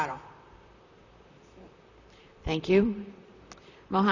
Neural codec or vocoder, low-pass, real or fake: vocoder, 44.1 kHz, 128 mel bands, Pupu-Vocoder; 7.2 kHz; fake